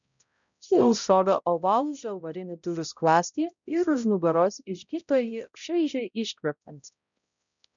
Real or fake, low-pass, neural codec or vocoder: fake; 7.2 kHz; codec, 16 kHz, 0.5 kbps, X-Codec, HuBERT features, trained on balanced general audio